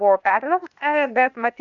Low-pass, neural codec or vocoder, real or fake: 7.2 kHz; codec, 16 kHz, 0.8 kbps, ZipCodec; fake